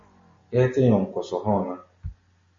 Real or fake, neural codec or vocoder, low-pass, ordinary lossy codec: real; none; 7.2 kHz; MP3, 32 kbps